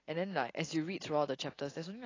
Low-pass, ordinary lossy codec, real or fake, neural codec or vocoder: 7.2 kHz; AAC, 32 kbps; real; none